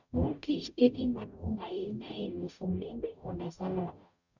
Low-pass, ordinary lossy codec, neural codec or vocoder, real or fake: 7.2 kHz; none; codec, 44.1 kHz, 0.9 kbps, DAC; fake